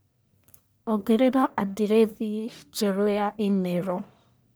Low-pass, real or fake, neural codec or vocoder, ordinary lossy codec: none; fake; codec, 44.1 kHz, 1.7 kbps, Pupu-Codec; none